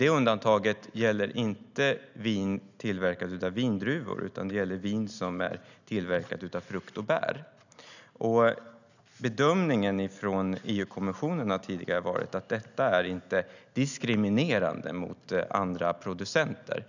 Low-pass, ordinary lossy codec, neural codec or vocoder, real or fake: 7.2 kHz; none; none; real